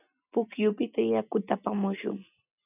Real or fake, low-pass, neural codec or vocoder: real; 3.6 kHz; none